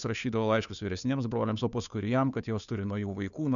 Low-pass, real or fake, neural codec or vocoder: 7.2 kHz; fake; codec, 16 kHz, 2 kbps, FunCodec, trained on Chinese and English, 25 frames a second